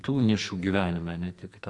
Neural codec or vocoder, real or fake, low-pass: codec, 44.1 kHz, 2.6 kbps, SNAC; fake; 10.8 kHz